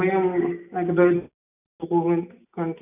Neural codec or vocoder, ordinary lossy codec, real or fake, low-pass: none; none; real; 3.6 kHz